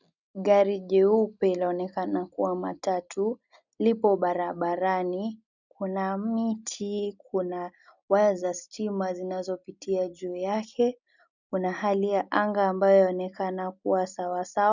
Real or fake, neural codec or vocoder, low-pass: real; none; 7.2 kHz